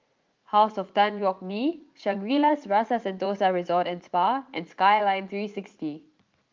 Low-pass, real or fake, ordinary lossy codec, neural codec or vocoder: 7.2 kHz; fake; Opus, 32 kbps; vocoder, 44.1 kHz, 80 mel bands, Vocos